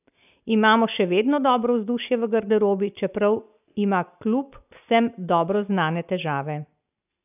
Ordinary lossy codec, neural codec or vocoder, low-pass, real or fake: none; none; 3.6 kHz; real